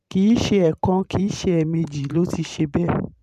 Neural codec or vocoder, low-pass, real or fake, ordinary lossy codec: vocoder, 44.1 kHz, 128 mel bands every 512 samples, BigVGAN v2; 14.4 kHz; fake; MP3, 96 kbps